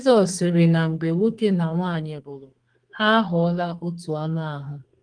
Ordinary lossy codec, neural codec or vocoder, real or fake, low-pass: Opus, 24 kbps; codec, 32 kHz, 1.9 kbps, SNAC; fake; 9.9 kHz